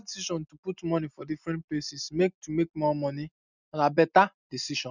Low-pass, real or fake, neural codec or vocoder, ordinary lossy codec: 7.2 kHz; real; none; none